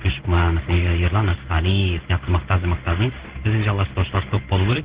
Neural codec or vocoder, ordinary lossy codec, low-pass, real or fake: codec, 16 kHz in and 24 kHz out, 1 kbps, XY-Tokenizer; Opus, 16 kbps; 3.6 kHz; fake